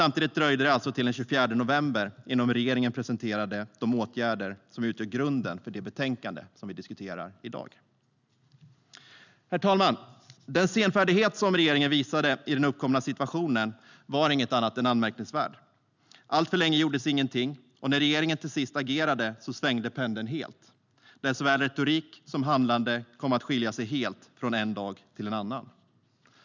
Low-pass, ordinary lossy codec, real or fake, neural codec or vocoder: 7.2 kHz; none; real; none